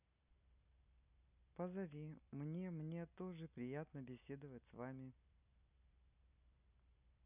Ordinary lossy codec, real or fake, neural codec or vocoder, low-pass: none; real; none; 3.6 kHz